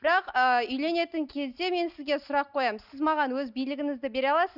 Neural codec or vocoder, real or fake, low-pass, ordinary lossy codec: none; real; 5.4 kHz; none